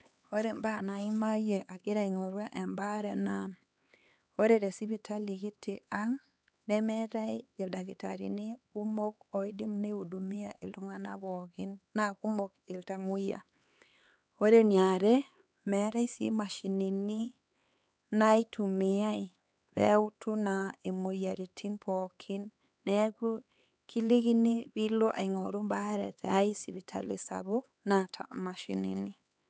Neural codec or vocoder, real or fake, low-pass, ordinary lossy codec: codec, 16 kHz, 4 kbps, X-Codec, HuBERT features, trained on LibriSpeech; fake; none; none